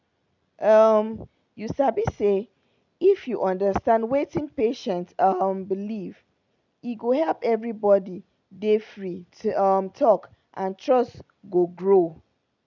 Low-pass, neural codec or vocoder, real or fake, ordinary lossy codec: 7.2 kHz; none; real; none